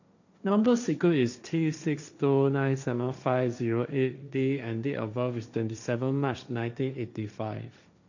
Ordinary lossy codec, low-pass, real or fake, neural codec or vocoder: none; 7.2 kHz; fake; codec, 16 kHz, 1.1 kbps, Voila-Tokenizer